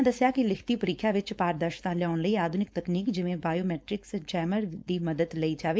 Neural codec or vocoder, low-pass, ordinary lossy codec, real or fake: codec, 16 kHz, 4.8 kbps, FACodec; none; none; fake